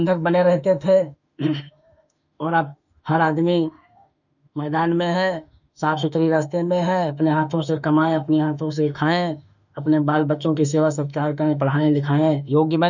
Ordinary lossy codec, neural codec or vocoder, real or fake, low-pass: none; autoencoder, 48 kHz, 32 numbers a frame, DAC-VAE, trained on Japanese speech; fake; 7.2 kHz